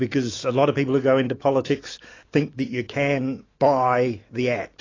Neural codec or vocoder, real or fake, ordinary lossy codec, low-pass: autoencoder, 48 kHz, 128 numbers a frame, DAC-VAE, trained on Japanese speech; fake; AAC, 32 kbps; 7.2 kHz